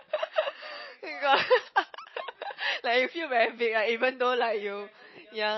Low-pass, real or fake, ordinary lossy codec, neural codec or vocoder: 7.2 kHz; real; MP3, 24 kbps; none